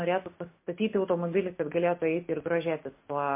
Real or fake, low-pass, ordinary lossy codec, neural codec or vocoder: real; 3.6 kHz; MP3, 24 kbps; none